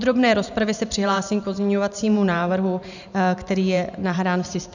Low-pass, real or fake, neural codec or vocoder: 7.2 kHz; fake; vocoder, 44.1 kHz, 128 mel bands every 512 samples, BigVGAN v2